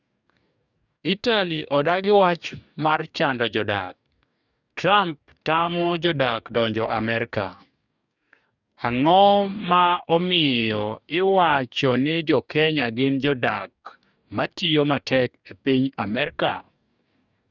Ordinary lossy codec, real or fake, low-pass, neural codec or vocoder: none; fake; 7.2 kHz; codec, 44.1 kHz, 2.6 kbps, DAC